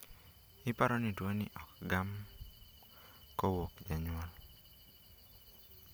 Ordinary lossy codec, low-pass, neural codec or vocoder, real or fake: none; none; none; real